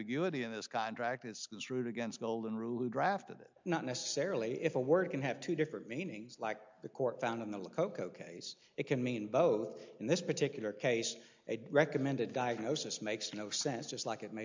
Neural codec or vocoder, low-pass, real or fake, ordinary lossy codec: none; 7.2 kHz; real; MP3, 64 kbps